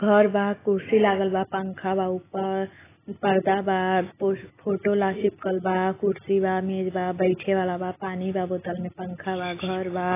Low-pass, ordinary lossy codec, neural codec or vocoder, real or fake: 3.6 kHz; AAC, 16 kbps; none; real